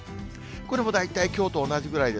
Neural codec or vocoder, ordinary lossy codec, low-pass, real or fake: none; none; none; real